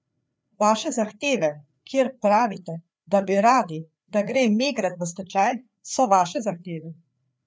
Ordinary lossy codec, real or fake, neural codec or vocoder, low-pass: none; fake; codec, 16 kHz, 4 kbps, FreqCodec, larger model; none